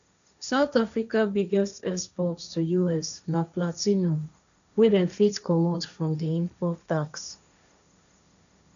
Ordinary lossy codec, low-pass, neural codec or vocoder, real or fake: none; 7.2 kHz; codec, 16 kHz, 1.1 kbps, Voila-Tokenizer; fake